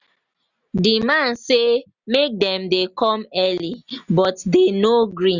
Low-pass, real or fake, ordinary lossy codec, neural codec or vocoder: 7.2 kHz; real; none; none